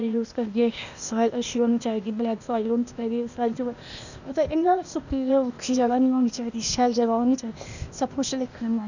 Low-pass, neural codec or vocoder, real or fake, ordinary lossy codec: 7.2 kHz; codec, 16 kHz, 0.8 kbps, ZipCodec; fake; none